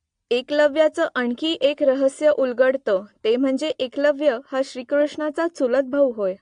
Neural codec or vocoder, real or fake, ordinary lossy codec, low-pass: none; real; AAC, 48 kbps; 9.9 kHz